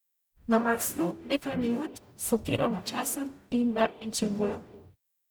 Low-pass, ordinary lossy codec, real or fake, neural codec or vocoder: none; none; fake; codec, 44.1 kHz, 0.9 kbps, DAC